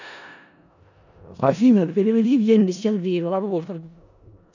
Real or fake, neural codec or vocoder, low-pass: fake; codec, 16 kHz in and 24 kHz out, 0.4 kbps, LongCat-Audio-Codec, four codebook decoder; 7.2 kHz